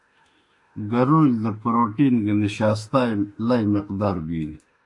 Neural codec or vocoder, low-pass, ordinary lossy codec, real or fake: autoencoder, 48 kHz, 32 numbers a frame, DAC-VAE, trained on Japanese speech; 10.8 kHz; AAC, 64 kbps; fake